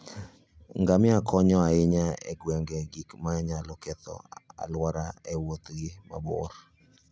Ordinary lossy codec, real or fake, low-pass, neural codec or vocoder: none; real; none; none